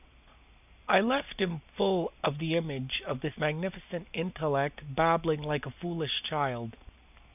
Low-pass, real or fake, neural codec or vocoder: 3.6 kHz; real; none